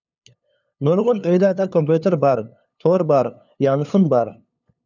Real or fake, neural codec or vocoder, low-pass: fake; codec, 16 kHz, 2 kbps, FunCodec, trained on LibriTTS, 25 frames a second; 7.2 kHz